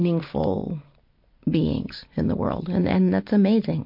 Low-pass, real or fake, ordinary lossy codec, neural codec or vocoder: 5.4 kHz; real; MP3, 32 kbps; none